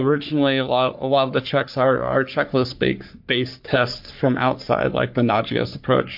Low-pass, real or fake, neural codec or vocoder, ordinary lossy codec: 5.4 kHz; fake; codec, 44.1 kHz, 3.4 kbps, Pupu-Codec; MP3, 48 kbps